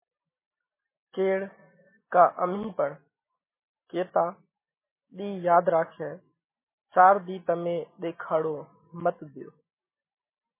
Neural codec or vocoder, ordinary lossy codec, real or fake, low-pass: none; MP3, 16 kbps; real; 3.6 kHz